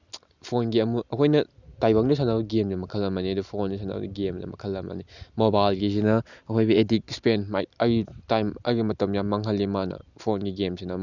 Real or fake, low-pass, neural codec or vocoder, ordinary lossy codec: real; 7.2 kHz; none; none